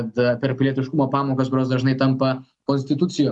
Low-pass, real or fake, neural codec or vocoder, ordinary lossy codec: 10.8 kHz; real; none; MP3, 96 kbps